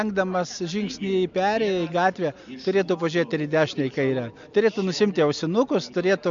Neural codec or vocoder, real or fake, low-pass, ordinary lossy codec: none; real; 7.2 kHz; MP3, 64 kbps